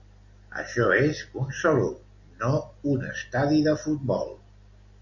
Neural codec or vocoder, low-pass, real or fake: none; 7.2 kHz; real